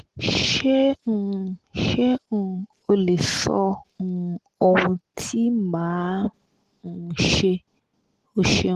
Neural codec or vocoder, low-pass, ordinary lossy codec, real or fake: none; 14.4 kHz; Opus, 16 kbps; real